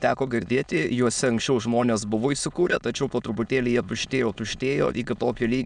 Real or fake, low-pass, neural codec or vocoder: fake; 9.9 kHz; autoencoder, 22.05 kHz, a latent of 192 numbers a frame, VITS, trained on many speakers